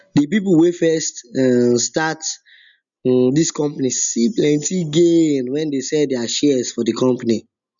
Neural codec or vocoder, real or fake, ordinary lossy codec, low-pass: none; real; none; 7.2 kHz